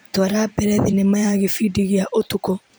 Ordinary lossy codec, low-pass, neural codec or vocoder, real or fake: none; none; vocoder, 44.1 kHz, 128 mel bands every 512 samples, BigVGAN v2; fake